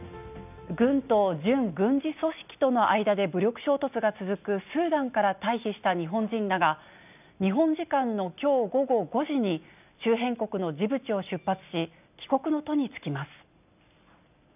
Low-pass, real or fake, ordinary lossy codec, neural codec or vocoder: 3.6 kHz; real; none; none